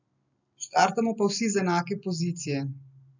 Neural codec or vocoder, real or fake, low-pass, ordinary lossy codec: none; real; 7.2 kHz; none